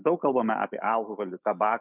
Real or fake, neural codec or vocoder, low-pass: fake; autoencoder, 48 kHz, 128 numbers a frame, DAC-VAE, trained on Japanese speech; 3.6 kHz